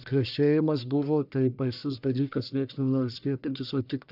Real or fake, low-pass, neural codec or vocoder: fake; 5.4 kHz; codec, 44.1 kHz, 1.7 kbps, Pupu-Codec